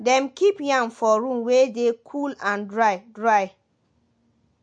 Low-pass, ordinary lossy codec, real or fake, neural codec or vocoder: 9.9 kHz; MP3, 48 kbps; real; none